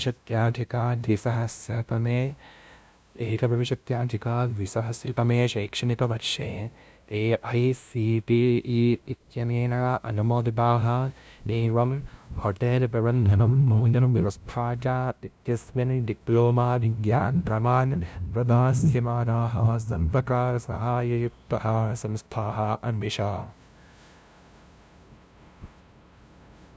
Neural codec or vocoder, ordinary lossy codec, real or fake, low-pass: codec, 16 kHz, 0.5 kbps, FunCodec, trained on LibriTTS, 25 frames a second; none; fake; none